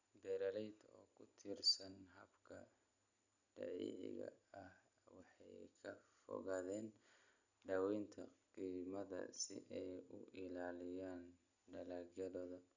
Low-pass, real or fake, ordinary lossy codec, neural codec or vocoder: 7.2 kHz; real; none; none